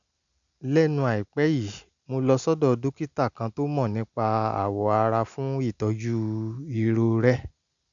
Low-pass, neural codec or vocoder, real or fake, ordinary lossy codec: 7.2 kHz; none; real; none